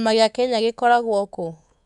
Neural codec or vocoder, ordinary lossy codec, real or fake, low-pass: codec, 24 kHz, 3.1 kbps, DualCodec; none; fake; 10.8 kHz